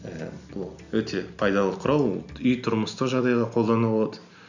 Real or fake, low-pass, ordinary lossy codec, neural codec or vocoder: real; 7.2 kHz; none; none